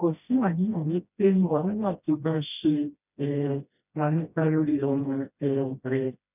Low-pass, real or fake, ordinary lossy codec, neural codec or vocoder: 3.6 kHz; fake; none; codec, 16 kHz, 1 kbps, FreqCodec, smaller model